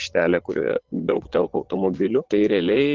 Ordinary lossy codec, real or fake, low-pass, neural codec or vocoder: Opus, 16 kbps; fake; 7.2 kHz; vocoder, 22.05 kHz, 80 mel bands, Vocos